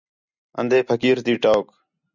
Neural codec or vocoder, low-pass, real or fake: none; 7.2 kHz; real